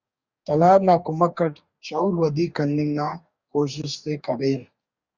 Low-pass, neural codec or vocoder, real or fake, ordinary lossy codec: 7.2 kHz; codec, 44.1 kHz, 2.6 kbps, DAC; fake; Opus, 64 kbps